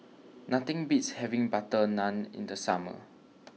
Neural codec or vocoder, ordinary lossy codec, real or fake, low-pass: none; none; real; none